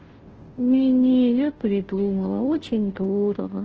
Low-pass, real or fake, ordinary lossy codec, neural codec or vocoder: 7.2 kHz; fake; Opus, 16 kbps; codec, 16 kHz, 0.5 kbps, FunCodec, trained on Chinese and English, 25 frames a second